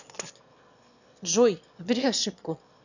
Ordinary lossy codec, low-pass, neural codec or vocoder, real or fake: none; 7.2 kHz; autoencoder, 22.05 kHz, a latent of 192 numbers a frame, VITS, trained on one speaker; fake